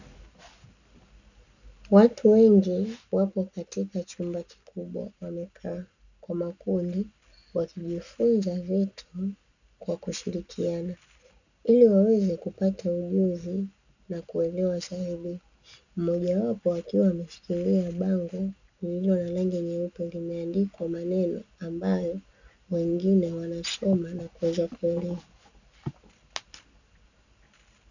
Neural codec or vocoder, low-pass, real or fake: none; 7.2 kHz; real